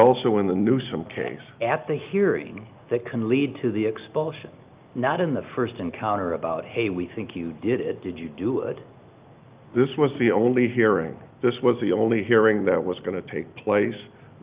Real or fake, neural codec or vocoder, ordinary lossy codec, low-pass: real; none; Opus, 24 kbps; 3.6 kHz